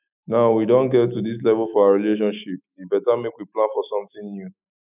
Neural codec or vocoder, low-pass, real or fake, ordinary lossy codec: autoencoder, 48 kHz, 128 numbers a frame, DAC-VAE, trained on Japanese speech; 3.6 kHz; fake; none